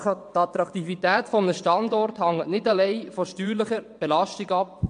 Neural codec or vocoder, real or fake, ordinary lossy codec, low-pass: vocoder, 22.05 kHz, 80 mel bands, WaveNeXt; fake; AAC, 64 kbps; 9.9 kHz